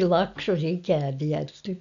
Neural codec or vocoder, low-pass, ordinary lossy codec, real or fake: none; 7.2 kHz; none; real